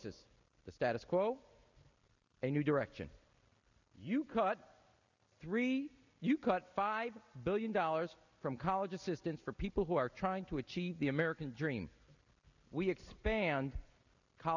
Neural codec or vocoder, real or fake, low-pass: none; real; 7.2 kHz